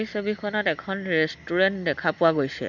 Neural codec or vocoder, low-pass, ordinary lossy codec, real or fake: none; 7.2 kHz; none; real